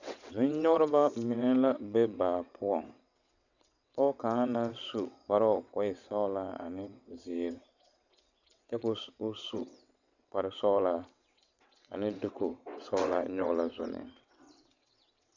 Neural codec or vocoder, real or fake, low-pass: vocoder, 22.05 kHz, 80 mel bands, WaveNeXt; fake; 7.2 kHz